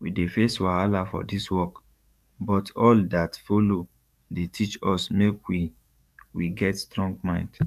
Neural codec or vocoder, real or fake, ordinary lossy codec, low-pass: codec, 44.1 kHz, 7.8 kbps, DAC; fake; none; 14.4 kHz